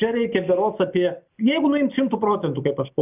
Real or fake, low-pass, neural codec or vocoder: real; 3.6 kHz; none